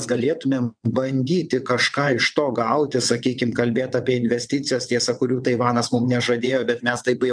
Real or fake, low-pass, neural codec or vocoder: fake; 9.9 kHz; vocoder, 22.05 kHz, 80 mel bands, WaveNeXt